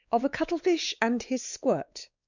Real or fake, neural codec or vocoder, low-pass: fake; codec, 16 kHz, 4 kbps, X-Codec, WavLM features, trained on Multilingual LibriSpeech; 7.2 kHz